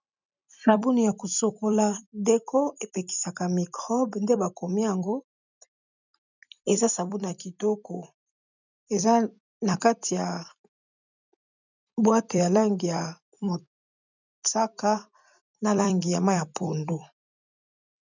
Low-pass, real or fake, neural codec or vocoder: 7.2 kHz; fake; vocoder, 44.1 kHz, 128 mel bands every 256 samples, BigVGAN v2